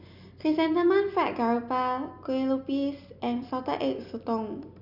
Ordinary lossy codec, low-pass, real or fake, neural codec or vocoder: none; 5.4 kHz; real; none